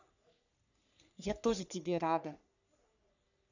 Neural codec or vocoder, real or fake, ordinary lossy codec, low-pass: codec, 44.1 kHz, 3.4 kbps, Pupu-Codec; fake; none; 7.2 kHz